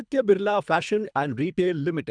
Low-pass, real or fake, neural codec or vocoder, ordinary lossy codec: 9.9 kHz; fake; codec, 24 kHz, 3 kbps, HILCodec; none